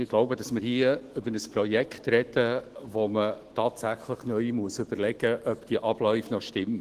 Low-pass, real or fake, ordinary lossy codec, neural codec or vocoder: 14.4 kHz; fake; Opus, 16 kbps; autoencoder, 48 kHz, 128 numbers a frame, DAC-VAE, trained on Japanese speech